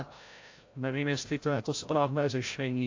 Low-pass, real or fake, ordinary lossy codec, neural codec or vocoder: 7.2 kHz; fake; AAC, 48 kbps; codec, 16 kHz, 0.5 kbps, FreqCodec, larger model